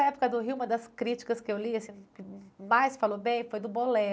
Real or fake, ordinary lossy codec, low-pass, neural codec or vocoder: real; none; none; none